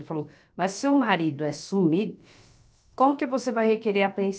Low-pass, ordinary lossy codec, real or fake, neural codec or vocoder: none; none; fake; codec, 16 kHz, about 1 kbps, DyCAST, with the encoder's durations